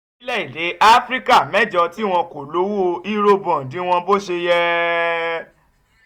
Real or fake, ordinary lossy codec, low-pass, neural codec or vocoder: real; none; 19.8 kHz; none